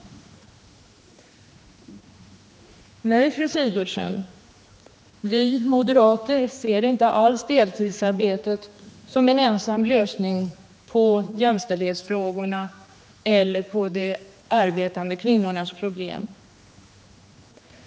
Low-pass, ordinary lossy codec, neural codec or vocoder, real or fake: none; none; codec, 16 kHz, 2 kbps, X-Codec, HuBERT features, trained on general audio; fake